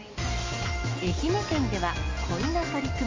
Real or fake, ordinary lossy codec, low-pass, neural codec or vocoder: real; MP3, 32 kbps; 7.2 kHz; none